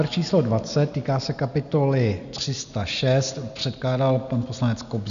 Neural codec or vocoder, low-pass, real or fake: none; 7.2 kHz; real